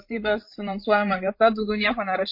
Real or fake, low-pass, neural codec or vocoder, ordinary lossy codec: fake; 5.4 kHz; codec, 16 kHz, 8 kbps, FreqCodec, larger model; MP3, 32 kbps